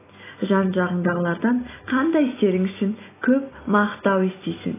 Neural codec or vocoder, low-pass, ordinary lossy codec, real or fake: none; 3.6 kHz; AAC, 16 kbps; real